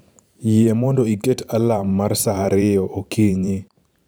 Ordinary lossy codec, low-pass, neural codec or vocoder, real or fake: none; none; vocoder, 44.1 kHz, 128 mel bands every 512 samples, BigVGAN v2; fake